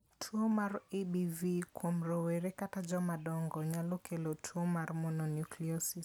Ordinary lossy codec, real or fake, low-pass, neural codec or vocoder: none; real; none; none